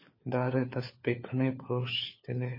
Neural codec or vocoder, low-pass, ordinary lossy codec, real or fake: codec, 16 kHz, 4 kbps, FunCodec, trained on LibriTTS, 50 frames a second; 5.4 kHz; MP3, 24 kbps; fake